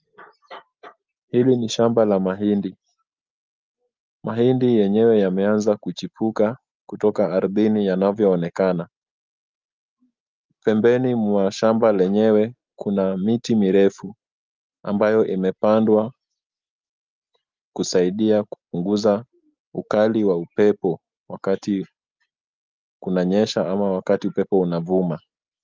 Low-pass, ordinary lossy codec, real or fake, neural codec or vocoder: 7.2 kHz; Opus, 24 kbps; real; none